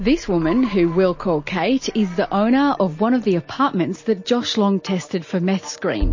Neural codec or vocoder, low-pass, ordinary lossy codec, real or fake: none; 7.2 kHz; MP3, 32 kbps; real